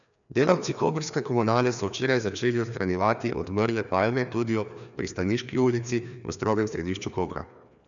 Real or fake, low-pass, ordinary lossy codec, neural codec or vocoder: fake; 7.2 kHz; none; codec, 16 kHz, 2 kbps, FreqCodec, larger model